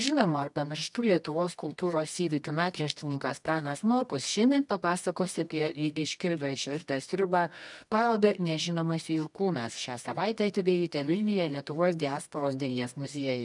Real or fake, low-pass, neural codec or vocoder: fake; 10.8 kHz; codec, 24 kHz, 0.9 kbps, WavTokenizer, medium music audio release